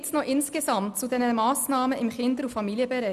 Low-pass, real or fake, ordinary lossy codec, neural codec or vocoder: 14.4 kHz; real; none; none